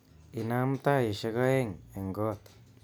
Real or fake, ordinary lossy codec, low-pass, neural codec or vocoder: real; none; none; none